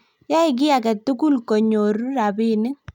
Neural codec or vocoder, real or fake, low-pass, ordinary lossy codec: none; real; 19.8 kHz; none